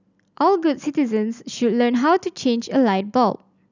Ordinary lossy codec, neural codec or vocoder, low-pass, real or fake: none; none; 7.2 kHz; real